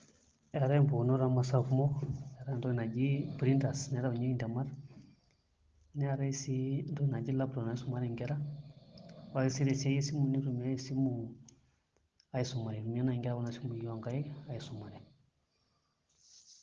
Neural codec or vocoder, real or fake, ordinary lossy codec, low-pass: none; real; Opus, 16 kbps; 7.2 kHz